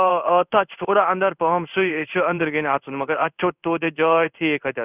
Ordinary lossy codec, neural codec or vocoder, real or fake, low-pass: none; codec, 16 kHz in and 24 kHz out, 1 kbps, XY-Tokenizer; fake; 3.6 kHz